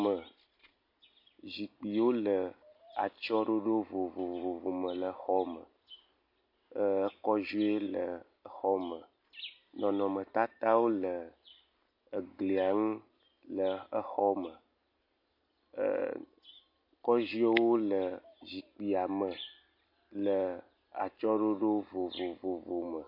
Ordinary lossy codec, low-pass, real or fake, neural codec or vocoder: MP3, 32 kbps; 5.4 kHz; real; none